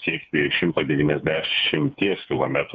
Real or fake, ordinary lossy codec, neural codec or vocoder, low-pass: fake; Opus, 64 kbps; codec, 16 kHz, 4 kbps, FreqCodec, smaller model; 7.2 kHz